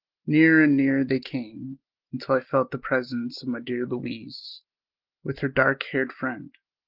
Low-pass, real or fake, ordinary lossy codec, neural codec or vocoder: 5.4 kHz; real; Opus, 16 kbps; none